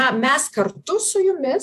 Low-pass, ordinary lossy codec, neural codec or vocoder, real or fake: 14.4 kHz; AAC, 96 kbps; vocoder, 48 kHz, 128 mel bands, Vocos; fake